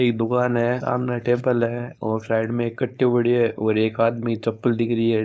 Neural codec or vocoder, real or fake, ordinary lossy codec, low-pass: codec, 16 kHz, 4.8 kbps, FACodec; fake; none; none